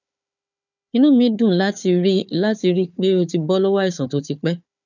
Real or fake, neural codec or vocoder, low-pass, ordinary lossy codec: fake; codec, 16 kHz, 4 kbps, FunCodec, trained on Chinese and English, 50 frames a second; 7.2 kHz; none